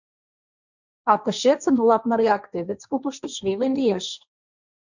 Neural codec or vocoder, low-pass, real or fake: codec, 16 kHz, 1.1 kbps, Voila-Tokenizer; 7.2 kHz; fake